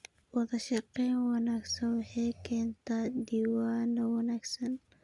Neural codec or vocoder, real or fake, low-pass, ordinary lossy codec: none; real; 10.8 kHz; Opus, 64 kbps